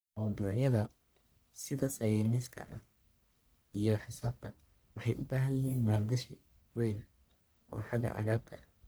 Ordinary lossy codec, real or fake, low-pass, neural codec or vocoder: none; fake; none; codec, 44.1 kHz, 1.7 kbps, Pupu-Codec